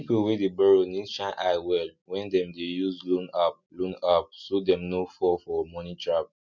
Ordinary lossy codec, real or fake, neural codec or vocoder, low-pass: none; real; none; 7.2 kHz